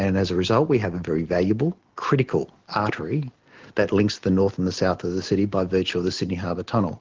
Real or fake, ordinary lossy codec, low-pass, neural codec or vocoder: real; Opus, 16 kbps; 7.2 kHz; none